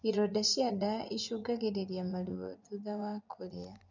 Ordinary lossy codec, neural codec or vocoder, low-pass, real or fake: none; vocoder, 24 kHz, 100 mel bands, Vocos; 7.2 kHz; fake